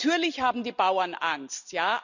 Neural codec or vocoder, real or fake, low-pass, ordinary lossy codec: none; real; 7.2 kHz; none